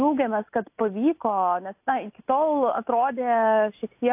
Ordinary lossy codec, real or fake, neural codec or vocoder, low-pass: AAC, 32 kbps; real; none; 3.6 kHz